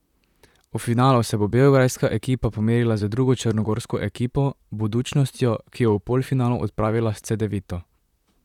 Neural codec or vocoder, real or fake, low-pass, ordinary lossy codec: vocoder, 44.1 kHz, 128 mel bands, Pupu-Vocoder; fake; 19.8 kHz; none